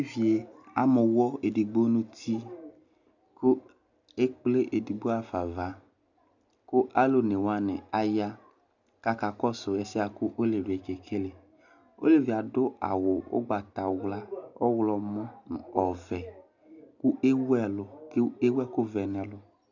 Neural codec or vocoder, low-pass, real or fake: none; 7.2 kHz; real